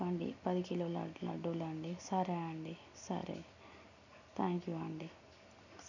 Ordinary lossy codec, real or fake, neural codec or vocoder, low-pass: none; real; none; 7.2 kHz